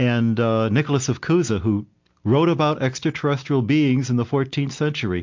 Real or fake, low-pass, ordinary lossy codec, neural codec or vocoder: real; 7.2 kHz; MP3, 48 kbps; none